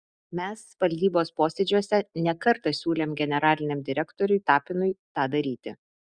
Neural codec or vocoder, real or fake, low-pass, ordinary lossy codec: none; real; 9.9 kHz; Opus, 64 kbps